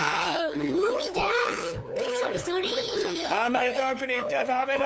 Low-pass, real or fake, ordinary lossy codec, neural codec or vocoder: none; fake; none; codec, 16 kHz, 2 kbps, FunCodec, trained on LibriTTS, 25 frames a second